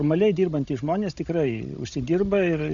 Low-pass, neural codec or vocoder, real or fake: 7.2 kHz; codec, 16 kHz, 16 kbps, FunCodec, trained on Chinese and English, 50 frames a second; fake